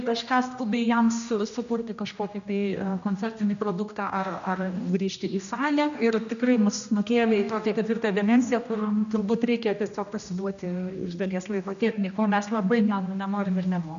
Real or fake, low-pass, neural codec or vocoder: fake; 7.2 kHz; codec, 16 kHz, 1 kbps, X-Codec, HuBERT features, trained on general audio